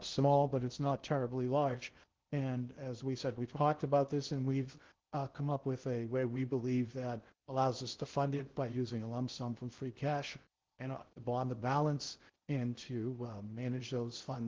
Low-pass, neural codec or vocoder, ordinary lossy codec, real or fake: 7.2 kHz; codec, 16 kHz in and 24 kHz out, 0.6 kbps, FocalCodec, streaming, 2048 codes; Opus, 16 kbps; fake